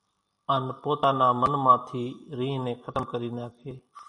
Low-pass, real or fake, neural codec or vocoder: 10.8 kHz; real; none